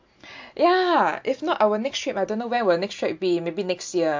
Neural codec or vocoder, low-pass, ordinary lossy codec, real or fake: none; 7.2 kHz; MP3, 48 kbps; real